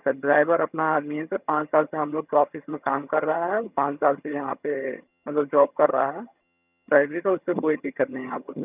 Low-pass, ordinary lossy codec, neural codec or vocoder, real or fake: 3.6 kHz; none; vocoder, 22.05 kHz, 80 mel bands, HiFi-GAN; fake